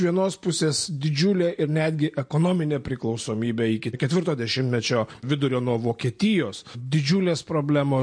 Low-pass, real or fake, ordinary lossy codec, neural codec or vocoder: 9.9 kHz; real; MP3, 48 kbps; none